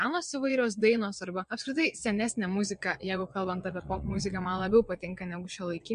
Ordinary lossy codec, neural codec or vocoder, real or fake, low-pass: MP3, 64 kbps; vocoder, 22.05 kHz, 80 mel bands, WaveNeXt; fake; 9.9 kHz